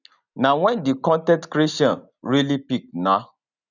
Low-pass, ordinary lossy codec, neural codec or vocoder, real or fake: 7.2 kHz; none; none; real